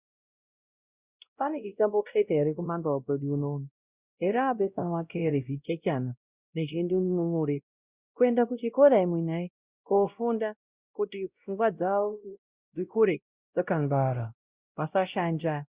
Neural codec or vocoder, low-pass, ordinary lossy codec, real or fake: codec, 16 kHz, 0.5 kbps, X-Codec, WavLM features, trained on Multilingual LibriSpeech; 3.6 kHz; Opus, 64 kbps; fake